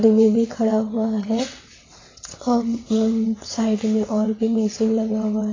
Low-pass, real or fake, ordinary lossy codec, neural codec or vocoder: 7.2 kHz; fake; AAC, 32 kbps; vocoder, 22.05 kHz, 80 mel bands, WaveNeXt